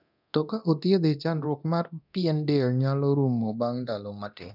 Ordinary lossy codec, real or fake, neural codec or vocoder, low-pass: none; fake; codec, 24 kHz, 0.9 kbps, DualCodec; 5.4 kHz